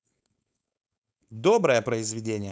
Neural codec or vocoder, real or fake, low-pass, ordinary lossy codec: codec, 16 kHz, 4.8 kbps, FACodec; fake; none; none